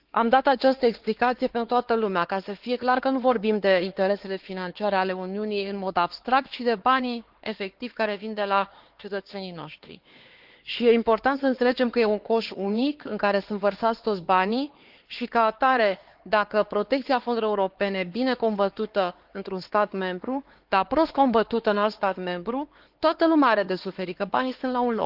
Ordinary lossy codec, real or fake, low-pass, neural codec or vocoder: Opus, 16 kbps; fake; 5.4 kHz; codec, 16 kHz, 4 kbps, X-Codec, HuBERT features, trained on LibriSpeech